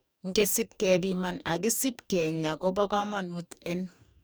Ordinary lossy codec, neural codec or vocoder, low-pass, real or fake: none; codec, 44.1 kHz, 2.6 kbps, DAC; none; fake